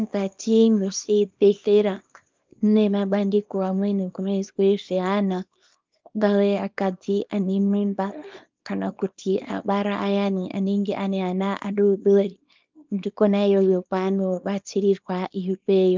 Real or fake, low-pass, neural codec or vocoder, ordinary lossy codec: fake; 7.2 kHz; codec, 24 kHz, 0.9 kbps, WavTokenizer, small release; Opus, 32 kbps